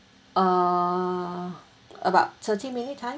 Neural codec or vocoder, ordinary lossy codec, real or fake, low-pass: none; none; real; none